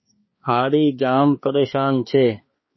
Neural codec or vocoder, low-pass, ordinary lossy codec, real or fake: codec, 16 kHz, 2 kbps, X-Codec, HuBERT features, trained on balanced general audio; 7.2 kHz; MP3, 24 kbps; fake